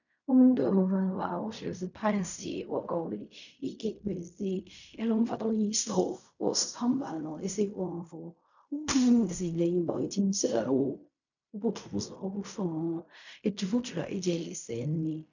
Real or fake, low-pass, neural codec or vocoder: fake; 7.2 kHz; codec, 16 kHz in and 24 kHz out, 0.4 kbps, LongCat-Audio-Codec, fine tuned four codebook decoder